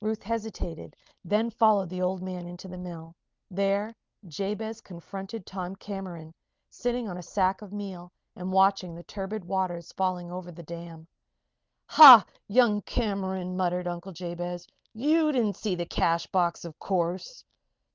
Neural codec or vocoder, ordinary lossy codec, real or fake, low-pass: none; Opus, 32 kbps; real; 7.2 kHz